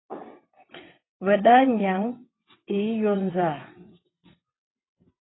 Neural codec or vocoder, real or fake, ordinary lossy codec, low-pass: vocoder, 22.05 kHz, 80 mel bands, WaveNeXt; fake; AAC, 16 kbps; 7.2 kHz